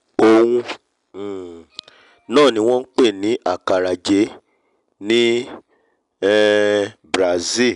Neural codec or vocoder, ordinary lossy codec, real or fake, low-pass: none; none; real; 10.8 kHz